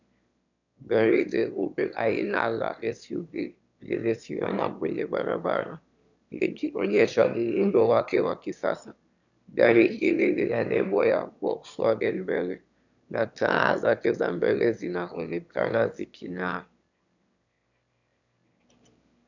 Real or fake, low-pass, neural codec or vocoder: fake; 7.2 kHz; autoencoder, 22.05 kHz, a latent of 192 numbers a frame, VITS, trained on one speaker